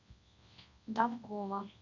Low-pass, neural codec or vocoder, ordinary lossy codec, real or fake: 7.2 kHz; codec, 24 kHz, 0.9 kbps, WavTokenizer, large speech release; MP3, 64 kbps; fake